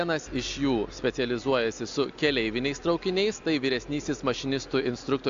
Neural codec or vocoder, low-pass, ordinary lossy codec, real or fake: none; 7.2 kHz; AAC, 64 kbps; real